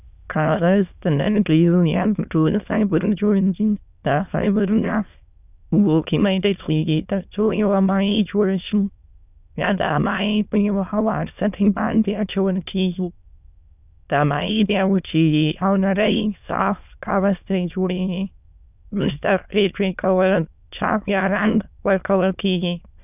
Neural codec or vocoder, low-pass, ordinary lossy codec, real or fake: autoencoder, 22.05 kHz, a latent of 192 numbers a frame, VITS, trained on many speakers; 3.6 kHz; none; fake